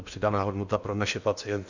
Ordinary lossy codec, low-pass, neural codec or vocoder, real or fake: Opus, 64 kbps; 7.2 kHz; codec, 16 kHz in and 24 kHz out, 0.8 kbps, FocalCodec, streaming, 65536 codes; fake